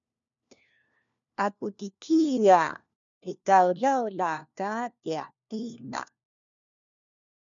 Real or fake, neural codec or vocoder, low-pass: fake; codec, 16 kHz, 1 kbps, FunCodec, trained on LibriTTS, 50 frames a second; 7.2 kHz